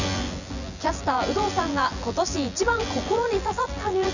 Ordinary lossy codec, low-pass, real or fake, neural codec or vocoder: none; 7.2 kHz; fake; vocoder, 24 kHz, 100 mel bands, Vocos